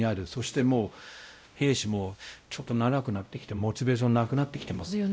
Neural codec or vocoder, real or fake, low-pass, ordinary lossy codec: codec, 16 kHz, 0.5 kbps, X-Codec, WavLM features, trained on Multilingual LibriSpeech; fake; none; none